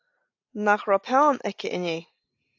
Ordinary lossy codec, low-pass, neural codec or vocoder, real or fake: AAC, 48 kbps; 7.2 kHz; none; real